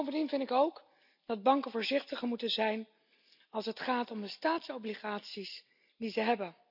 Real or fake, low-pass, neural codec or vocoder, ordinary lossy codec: real; 5.4 kHz; none; none